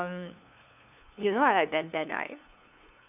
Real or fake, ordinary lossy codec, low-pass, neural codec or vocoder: fake; none; 3.6 kHz; codec, 16 kHz, 2 kbps, FunCodec, trained on LibriTTS, 25 frames a second